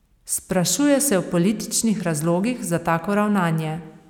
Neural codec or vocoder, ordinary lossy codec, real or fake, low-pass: none; none; real; 19.8 kHz